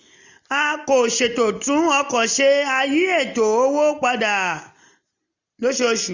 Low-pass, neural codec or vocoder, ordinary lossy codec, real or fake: 7.2 kHz; none; none; real